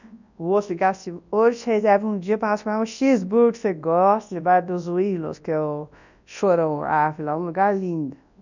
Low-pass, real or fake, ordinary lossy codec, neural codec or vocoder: 7.2 kHz; fake; none; codec, 24 kHz, 0.9 kbps, WavTokenizer, large speech release